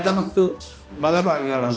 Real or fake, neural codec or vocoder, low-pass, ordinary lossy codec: fake; codec, 16 kHz, 1 kbps, X-Codec, HuBERT features, trained on balanced general audio; none; none